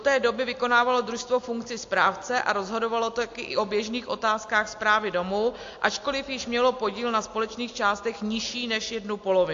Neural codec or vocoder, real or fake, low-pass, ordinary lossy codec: none; real; 7.2 kHz; AAC, 48 kbps